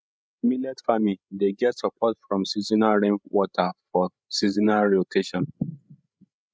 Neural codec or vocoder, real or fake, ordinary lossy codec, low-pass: codec, 16 kHz, 16 kbps, FreqCodec, larger model; fake; none; none